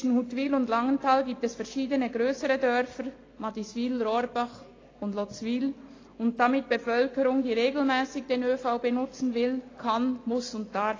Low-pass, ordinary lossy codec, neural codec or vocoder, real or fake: 7.2 kHz; AAC, 32 kbps; none; real